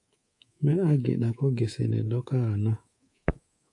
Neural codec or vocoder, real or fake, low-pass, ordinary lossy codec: codec, 24 kHz, 3.1 kbps, DualCodec; fake; 10.8 kHz; AAC, 48 kbps